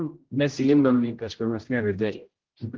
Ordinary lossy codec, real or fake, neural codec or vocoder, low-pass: Opus, 16 kbps; fake; codec, 16 kHz, 0.5 kbps, X-Codec, HuBERT features, trained on general audio; 7.2 kHz